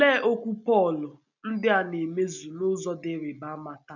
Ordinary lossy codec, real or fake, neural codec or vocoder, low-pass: none; real; none; 7.2 kHz